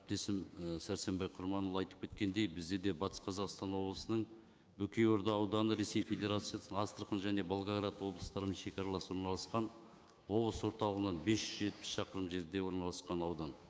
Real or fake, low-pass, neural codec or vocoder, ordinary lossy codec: fake; none; codec, 16 kHz, 6 kbps, DAC; none